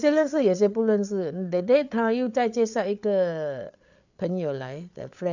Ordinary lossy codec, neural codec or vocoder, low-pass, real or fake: none; codec, 16 kHz, 8 kbps, FreqCodec, larger model; 7.2 kHz; fake